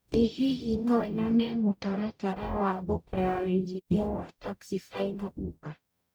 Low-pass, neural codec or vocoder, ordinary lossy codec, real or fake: none; codec, 44.1 kHz, 0.9 kbps, DAC; none; fake